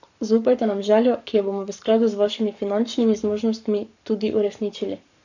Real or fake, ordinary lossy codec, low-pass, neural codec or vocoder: fake; none; 7.2 kHz; codec, 44.1 kHz, 7.8 kbps, Pupu-Codec